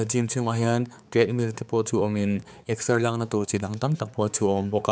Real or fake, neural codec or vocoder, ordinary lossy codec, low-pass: fake; codec, 16 kHz, 4 kbps, X-Codec, HuBERT features, trained on balanced general audio; none; none